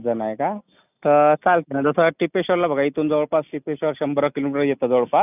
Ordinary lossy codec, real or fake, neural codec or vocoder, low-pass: none; real; none; 3.6 kHz